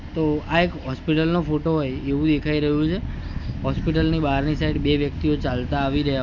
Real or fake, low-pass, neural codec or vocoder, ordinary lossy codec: real; 7.2 kHz; none; none